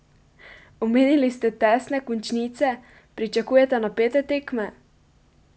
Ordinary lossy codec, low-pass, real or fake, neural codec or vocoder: none; none; real; none